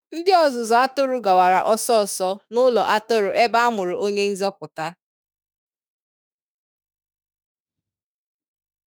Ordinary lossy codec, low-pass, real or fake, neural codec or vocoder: none; none; fake; autoencoder, 48 kHz, 32 numbers a frame, DAC-VAE, trained on Japanese speech